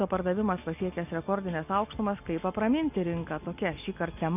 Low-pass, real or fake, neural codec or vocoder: 3.6 kHz; real; none